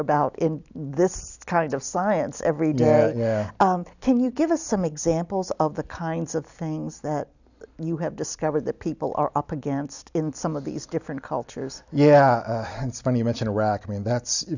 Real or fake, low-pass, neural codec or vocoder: real; 7.2 kHz; none